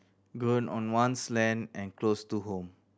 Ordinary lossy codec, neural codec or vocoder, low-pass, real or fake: none; none; none; real